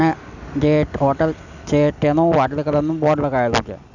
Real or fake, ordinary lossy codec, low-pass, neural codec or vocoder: real; none; 7.2 kHz; none